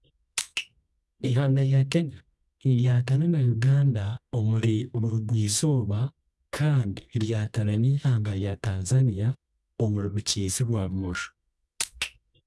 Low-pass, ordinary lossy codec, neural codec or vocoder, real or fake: none; none; codec, 24 kHz, 0.9 kbps, WavTokenizer, medium music audio release; fake